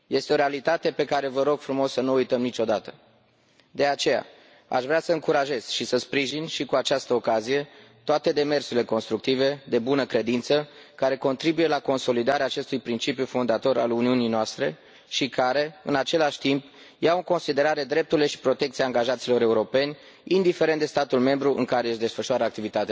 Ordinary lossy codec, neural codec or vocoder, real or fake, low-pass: none; none; real; none